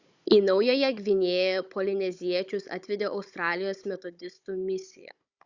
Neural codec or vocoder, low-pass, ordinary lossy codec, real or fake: codec, 16 kHz, 16 kbps, FunCodec, trained on Chinese and English, 50 frames a second; 7.2 kHz; Opus, 64 kbps; fake